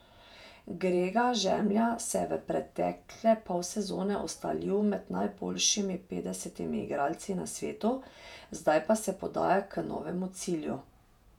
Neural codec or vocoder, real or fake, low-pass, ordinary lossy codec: vocoder, 48 kHz, 128 mel bands, Vocos; fake; 19.8 kHz; none